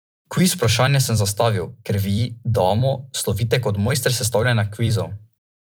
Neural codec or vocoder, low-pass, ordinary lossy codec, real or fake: vocoder, 44.1 kHz, 128 mel bands every 256 samples, BigVGAN v2; none; none; fake